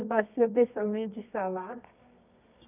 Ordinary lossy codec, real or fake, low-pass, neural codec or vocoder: none; fake; 3.6 kHz; codec, 24 kHz, 0.9 kbps, WavTokenizer, medium music audio release